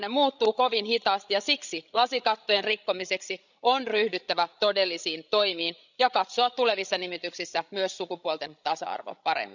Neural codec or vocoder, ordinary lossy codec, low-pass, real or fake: codec, 16 kHz, 16 kbps, FreqCodec, larger model; none; 7.2 kHz; fake